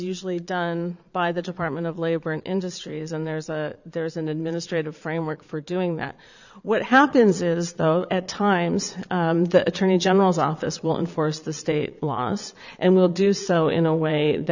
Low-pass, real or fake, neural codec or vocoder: 7.2 kHz; fake; vocoder, 22.05 kHz, 80 mel bands, Vocos